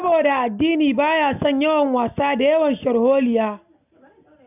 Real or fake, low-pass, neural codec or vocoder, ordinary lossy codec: real; 3.6 kHz; none; none